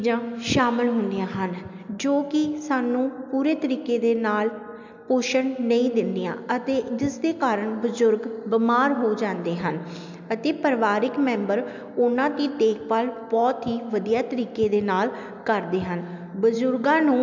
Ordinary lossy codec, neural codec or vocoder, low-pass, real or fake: MP3, 64 kbps; none; 7.2 kHz; real